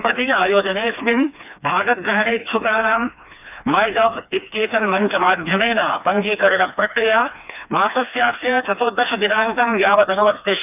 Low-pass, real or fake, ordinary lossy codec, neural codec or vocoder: 3.6 kHz; fake; none; codec, 16 kHz, 2 kbps, FreqCodec, smaller model